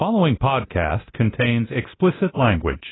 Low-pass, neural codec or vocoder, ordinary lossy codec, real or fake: 7.2 kHz; codec, 24 kHz, 0.9 kbps, DualCodec; AAC, 16 kbps; fake